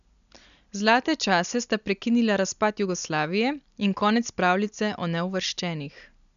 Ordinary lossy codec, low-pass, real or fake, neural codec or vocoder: none; 7.2 kHz; real; none